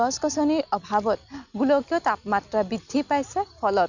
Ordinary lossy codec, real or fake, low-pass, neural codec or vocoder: none; real; 7.2 kHz; none